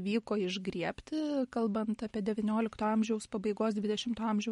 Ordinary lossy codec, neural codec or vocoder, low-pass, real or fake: MP3, 48 kbps; none; 14.4 kHz; real